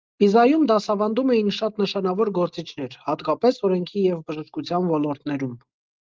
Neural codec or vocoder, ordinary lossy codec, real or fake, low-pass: none; Opus, 32 kbps; real; 7.2 kHz